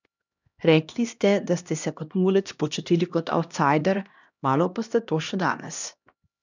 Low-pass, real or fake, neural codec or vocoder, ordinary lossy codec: 7.2 kHz; fake; codec, 16 kHz, 1 kbps, X-Codec, HuBERT features, trained on LibriSpeech; none